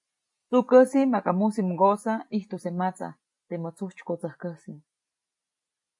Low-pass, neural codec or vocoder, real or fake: 10.8 kHz; none; real